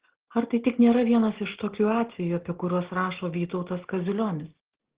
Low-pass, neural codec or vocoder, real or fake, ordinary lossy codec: 3.6 kHz; none; real; Opus, 16 kbps